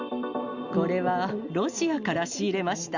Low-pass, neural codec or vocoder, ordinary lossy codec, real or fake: 7.2 kHz; none; Opus, 64 kbps; real